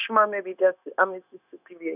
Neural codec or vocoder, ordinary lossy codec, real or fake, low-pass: codec, 16 kHz, 0.9 kbps, LongCat-Audio-Codec; none; fake; 3.6 kHz